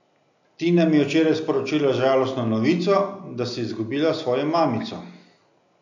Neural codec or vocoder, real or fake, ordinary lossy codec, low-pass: none; real; none; 7.2 kHz